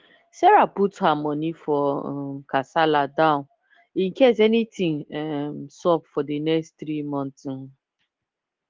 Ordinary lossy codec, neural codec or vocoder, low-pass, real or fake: Opus, 16 kbps; none; 7.2 kHz; real